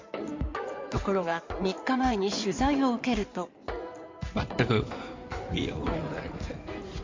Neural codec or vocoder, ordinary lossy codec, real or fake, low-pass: codec, 16 kHz in and 24 kHz out, 2.2 kbps, FireRedTTS-2 codec; MP3, 48 kbps; fake; 7.2 kHz